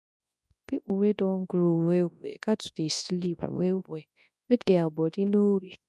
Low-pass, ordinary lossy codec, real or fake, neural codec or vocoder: none; none; fake; codec, 24 kHz, 0.9 kbps, WavTokenizer, large speech release